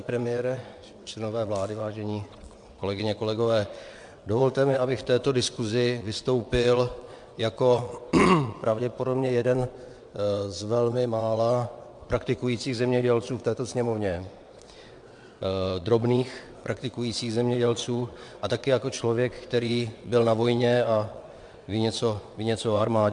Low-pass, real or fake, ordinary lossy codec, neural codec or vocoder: 9.9 kHz; fake; MP3, 64 kbps; vocoder, 22.05 kHz, 80 mel bands, WaveNeXt